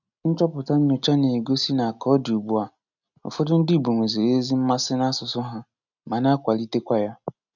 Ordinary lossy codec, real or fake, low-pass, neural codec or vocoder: none; real; 7.2 kHz; none